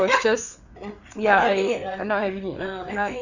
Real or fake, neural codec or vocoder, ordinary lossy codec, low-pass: fake; codec, 16 kHz, 4 kbps, FreqCodec, larger model; none; 7.2 kHz